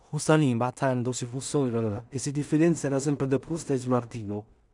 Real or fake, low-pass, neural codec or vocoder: fake; 10.8 kHz; codec, 16 kHz in and 24 kHz out, 0.4 kbps, LongCat-Audio-Codec, two codebook decoder